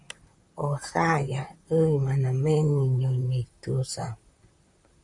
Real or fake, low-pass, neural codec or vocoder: fake; 10.8 kHz; vocoder, 44.1 kHz, 128 mel bands, Pupu-Vocoder